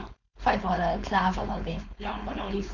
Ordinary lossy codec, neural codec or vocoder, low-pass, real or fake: none; codec, 16 kHz, 4.8 kbps, FACodec; 7.2 kHz; fake